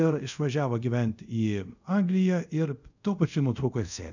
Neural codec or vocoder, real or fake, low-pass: codec, 24 kHz, 0.5 kbps, DualCodec; fake; 7.2 kHz